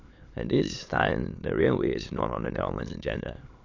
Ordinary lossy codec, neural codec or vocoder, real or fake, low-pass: AAC, 48 kbps; autoencoder, 22.05 kHz, a latent of 192 numbers a frame, VITS, trained on many speakers; fake; 7.2 kHz